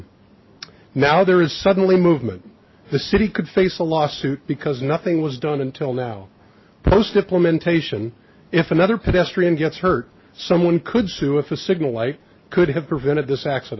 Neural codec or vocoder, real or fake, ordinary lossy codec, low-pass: none; real; MP3, 24 kbps; 7.2 kHz